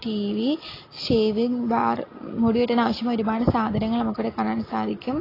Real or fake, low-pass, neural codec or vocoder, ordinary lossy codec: real; 5.4 kHz; none; AAC, 24 kbps